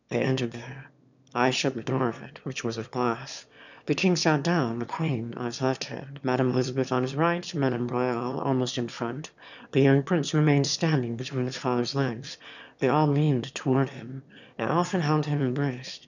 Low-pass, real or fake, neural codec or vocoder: 7.2 kHz; fake; autoencoder, 22.05 kHz, a latent of 192 numbers a frame, VITS, trained on one speaker